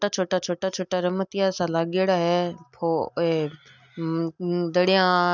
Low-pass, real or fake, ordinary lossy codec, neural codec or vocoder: 7.2 kHz; real; none; none